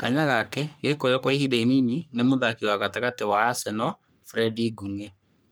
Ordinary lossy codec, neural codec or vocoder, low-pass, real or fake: none; codec, 44.1 kHz, 3.4 kbps, Pupu-Codec; none; fake